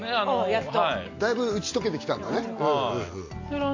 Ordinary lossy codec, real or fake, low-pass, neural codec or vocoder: AAC, 48 kbps; real; 7.2 kHz; none